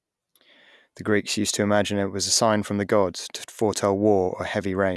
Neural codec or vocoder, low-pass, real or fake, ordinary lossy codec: none; none; real; none